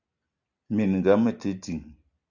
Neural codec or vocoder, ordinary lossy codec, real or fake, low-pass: none; Opus, 64 kbps; real; 7.2 kHz